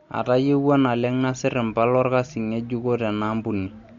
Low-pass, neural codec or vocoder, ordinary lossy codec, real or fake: 7.2 kHz; none; MP3, 48 kbps; real